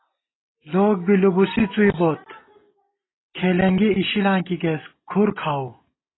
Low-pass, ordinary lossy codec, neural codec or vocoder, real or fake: 7.2 kHz; AAC, 16 kbps; none; real